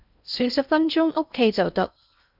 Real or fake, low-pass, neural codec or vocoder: fake; 5.4 kHz; codec, 16 kHz in and 24 kHz out, 0.6 kbps, FocalCodec, streaming, 4096 codes